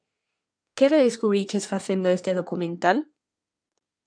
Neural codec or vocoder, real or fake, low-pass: codec, 24 kHz, 1 kbps, SNAC; fake; 9.9 kHz